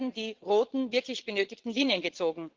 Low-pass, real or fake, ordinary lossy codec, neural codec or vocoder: 7.2 kHz; fake; Opus, 16 kbps; vocoder, 22.05 kHz, 80 mel bands, Vocos